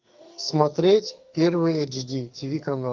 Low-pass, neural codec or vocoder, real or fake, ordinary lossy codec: 7.2 kHz; codec, 44.1 kHz, 2.6 kbps, SNAC; fake; Opus, 32 kbps